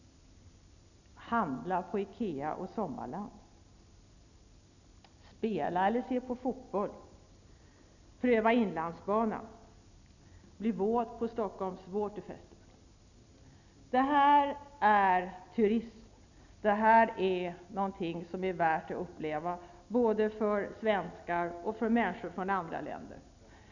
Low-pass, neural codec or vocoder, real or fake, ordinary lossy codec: 7.2 kHz; none; real; none